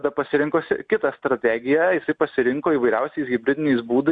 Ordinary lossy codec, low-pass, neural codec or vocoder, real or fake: Opus, 64 kbps; 9.9 kHz; none; real